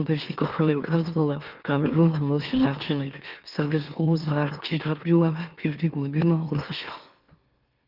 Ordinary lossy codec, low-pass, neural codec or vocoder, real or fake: Opus, 32 kbps; 5.4 kHz; autoencoder, 44.1 kHz, a latent of 192 numbers a frame, MeloTTS; fake